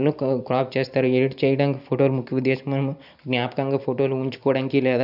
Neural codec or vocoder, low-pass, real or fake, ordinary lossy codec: none; 5.4 kHz; real; none